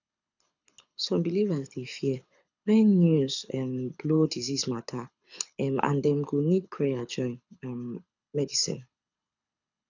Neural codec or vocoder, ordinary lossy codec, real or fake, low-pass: codec, 24 kHz, 6 kbps, HILCodec; none; fake; 7.2 kHz